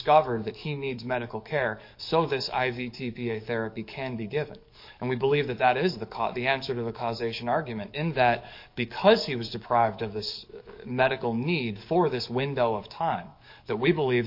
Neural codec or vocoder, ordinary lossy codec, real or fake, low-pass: codec, 44.1 kHz, 7.8 kbps, DAC; MP3, 32 kbps; fake; 5.4 kHz